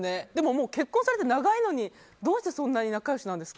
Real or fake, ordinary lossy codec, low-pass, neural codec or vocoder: real; none; none; none